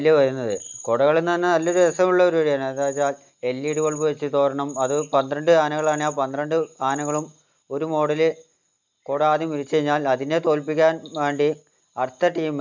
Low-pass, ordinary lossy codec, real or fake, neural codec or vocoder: 7.2 kHz; MP3, 64 kbps; real; none